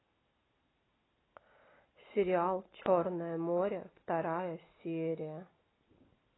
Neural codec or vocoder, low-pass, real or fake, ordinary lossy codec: none; 7.2 kHz; real; AAC, 16 kbps